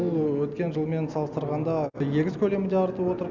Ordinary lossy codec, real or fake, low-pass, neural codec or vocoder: none; real; 7.2 kHz; none